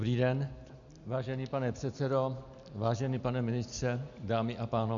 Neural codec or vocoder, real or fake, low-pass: none; real; 7.2 kHz